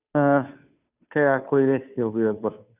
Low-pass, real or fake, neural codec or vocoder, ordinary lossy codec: 3.6 kHz; fake; codec, 16 kHz, 2 kbps, FunCodec, trained on Chinese and English, 25 frames a second; none